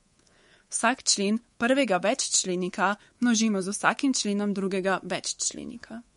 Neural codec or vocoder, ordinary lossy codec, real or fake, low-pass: codec, 24 kHz, 3.1 kbps, DualCodec; MP3, 48 kbps; fake; 10.8 kHz